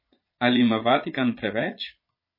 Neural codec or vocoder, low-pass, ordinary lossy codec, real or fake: vocoder, 22.05 kHz, 80 mel bands, Vocos; 5.4 kHz; MP3, 24 kbps; fake